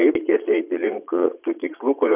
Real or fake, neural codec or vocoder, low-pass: fake; vocoder, 22.05 kHz, 80 mel bands, Vocos; 3.6 kHz